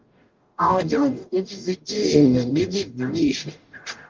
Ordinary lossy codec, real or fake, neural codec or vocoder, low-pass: Opus, 24 kbps; fake; codec, 44.1 kHz, 0.9 kbps, DAC; 7.2 kHz